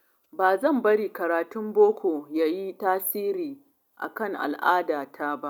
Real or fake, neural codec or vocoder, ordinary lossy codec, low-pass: real; none; none; 19.8 kHz